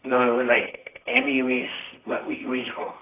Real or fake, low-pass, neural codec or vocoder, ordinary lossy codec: fake; 3.6 kHz; codec, 24 kHz, 0.9 kbps, WavTokenizer, medium music audio release; AAC, 24 kbps